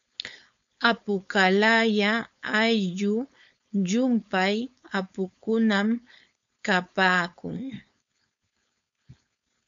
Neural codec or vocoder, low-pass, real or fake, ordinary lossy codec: codec, 16 kHz, 4.8 kbps, FACodec; 7.2 kHz; fake; MP3, 64 kbps